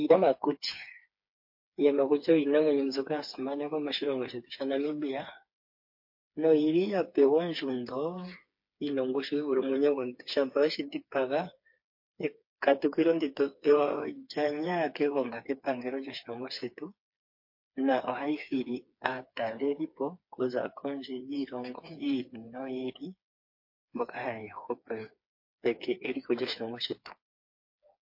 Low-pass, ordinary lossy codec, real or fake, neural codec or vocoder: 5.4 kHz; MP3, 32 kbps; fake; codec, 16 kHz, 4 kbps, FreqCodec, smaller model